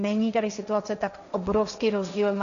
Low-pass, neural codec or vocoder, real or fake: 7.2 kHz; codec, 16 kHz, 1.1 kbps, Voila-Tokenizer; fake